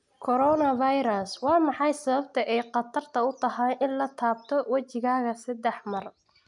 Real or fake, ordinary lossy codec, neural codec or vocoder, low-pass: real; none; none; 10.8 kHz